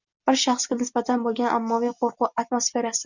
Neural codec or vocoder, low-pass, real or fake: none; 7.2 kHz; real